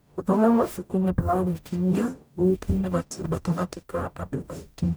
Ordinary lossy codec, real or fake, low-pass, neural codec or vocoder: none; fake; none; codec, 44.1 kHz, 0.9 kbps, DAC